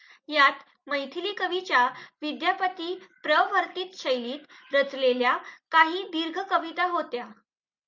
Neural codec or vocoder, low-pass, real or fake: none; 7.2 kHz; real